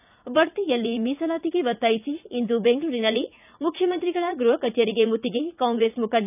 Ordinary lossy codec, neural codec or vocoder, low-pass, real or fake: none; vocoder, 44.1 kHz, 80 mel bands, Vocos; 3.6 kHz; fake